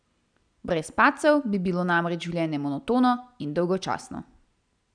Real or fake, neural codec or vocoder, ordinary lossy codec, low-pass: real; none; none; 9.9 kHz